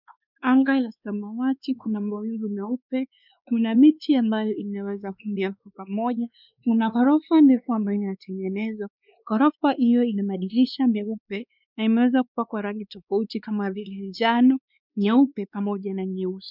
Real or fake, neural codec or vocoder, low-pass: fake; codec, 16 kHz, 2 kbps, X-Codec, WavLM features, trained on Multilingual LibriSpeech; 5.4 kHz